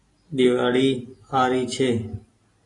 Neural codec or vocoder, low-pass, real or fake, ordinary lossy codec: none; 10.8 kHz; real; AAC, 32 kbps